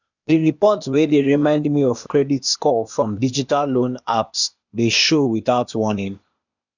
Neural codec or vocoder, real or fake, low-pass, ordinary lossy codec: codec, 16 kHz, 0.8 kbps, ZipCodec; fake; 7.2 kHz; none